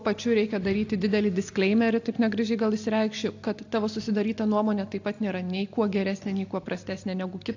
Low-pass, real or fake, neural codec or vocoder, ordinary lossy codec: 7.2 kHz; real; none; AAC, 48 kbps